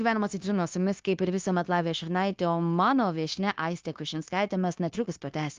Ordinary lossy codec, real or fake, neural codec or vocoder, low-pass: Opus, 32 kbps; fake; codec, 16 kHz, 0.9 kbps, LongCat-Audio-Codec; 7.2 kHz